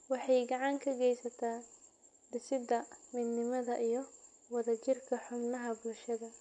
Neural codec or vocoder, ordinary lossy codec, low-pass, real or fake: none; none; 9.9 kHz; real